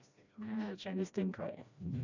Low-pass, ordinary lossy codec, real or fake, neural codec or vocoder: 7.2 kHz; none; fake; codec, 16 kHz, 1 kbps, FreqCodec, smaller model